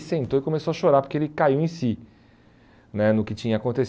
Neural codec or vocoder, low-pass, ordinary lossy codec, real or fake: none; none; none; real